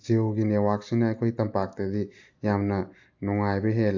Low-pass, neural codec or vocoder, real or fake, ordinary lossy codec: 7.2 kHz; none; real; AAC, 48 kbps